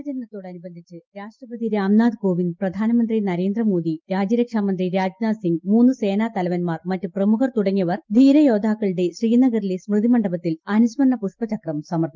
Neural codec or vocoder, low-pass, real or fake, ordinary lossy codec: none; 7.2 kHz; real; Opus, 32 kbps